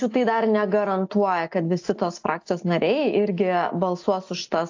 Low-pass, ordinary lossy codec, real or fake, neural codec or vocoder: 7.2 kHz; AAC, 48 kbps; real; none